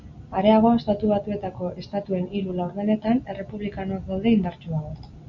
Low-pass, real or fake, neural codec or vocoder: 7.2 kHz; real; none